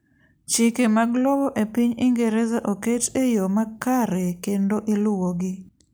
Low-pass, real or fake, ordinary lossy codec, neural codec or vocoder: none; real; none; none